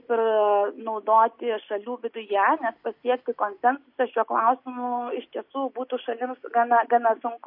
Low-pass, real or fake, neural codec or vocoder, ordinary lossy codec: 5.4 kHz; real; none; MP3, 32 kbps